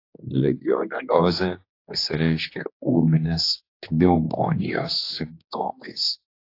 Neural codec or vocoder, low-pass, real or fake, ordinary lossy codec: codec, 16 kHz, 2 kbps, X-Codec, HuBERT features, trained on general audio; 5.4 kHz; fake; AAC, 32 kbps